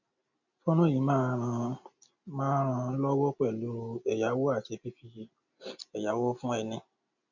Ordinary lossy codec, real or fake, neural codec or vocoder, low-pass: none; real; none; 7.2 kHz